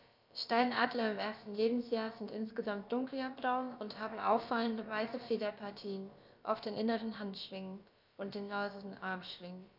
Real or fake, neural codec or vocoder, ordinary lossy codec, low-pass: fake; codec, 16 kHz, about 1 kbps, DyCAST, with the encoder's durations; none; 5.4 kHz